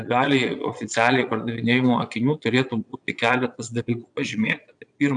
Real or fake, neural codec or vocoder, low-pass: fake; vocoder, 22.05 kHz, 80 mel bands, Vocos; 9.9 kHz